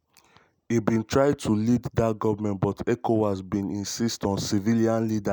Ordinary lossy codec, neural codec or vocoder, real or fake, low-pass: none; none; real; none